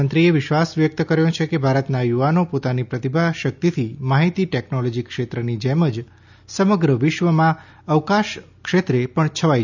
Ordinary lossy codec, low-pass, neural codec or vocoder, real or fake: none; 7.2 kHz; none; real